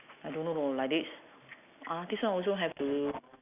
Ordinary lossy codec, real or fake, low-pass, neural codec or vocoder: none; real; 3.6 kHz; none